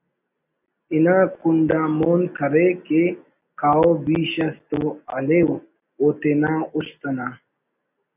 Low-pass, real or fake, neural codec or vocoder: 3.6 kHz; real; none